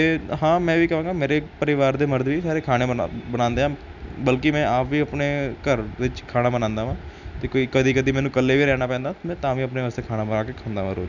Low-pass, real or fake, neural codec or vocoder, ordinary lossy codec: 7.2 kHz; real; none; none